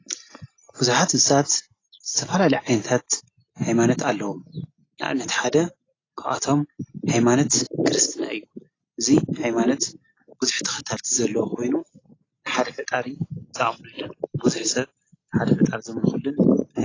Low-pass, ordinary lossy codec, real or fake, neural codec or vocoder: 7.2 kHz; AAC, 32 kbps; real; none